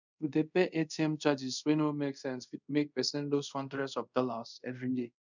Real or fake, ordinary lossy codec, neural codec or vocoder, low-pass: fake; none; codec, 24 kHz, 0.5 kbps, DualCodec; 7.2 kHz